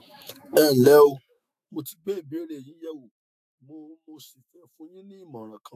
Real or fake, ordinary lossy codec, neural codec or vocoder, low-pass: fake; AAC, 96 kbps; autoencoder, 48 kHz, 128 numbers a frame, DAC-VAE, trained on Japanese speech; 14.4 kHz